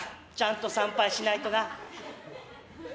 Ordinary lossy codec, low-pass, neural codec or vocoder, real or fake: none; none; none; real